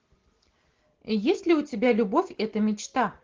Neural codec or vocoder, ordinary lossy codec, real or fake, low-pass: none; Opus, 16 kbps; real; 7.2 kHz